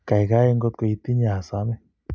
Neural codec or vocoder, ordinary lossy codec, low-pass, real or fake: none; none; none; real